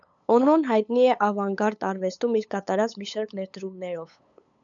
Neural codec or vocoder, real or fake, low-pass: codec, 16 kHz, 8 kbps, FunCodec, trained on LibriTTS, 25 frames a second; fake; 7.2 kHz